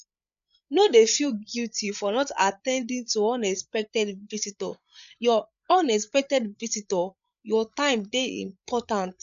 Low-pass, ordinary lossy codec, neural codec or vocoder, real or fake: 7.2 kHz; none; codec, 16 kHz, 8 kbps, FreqCodec, larger model; fake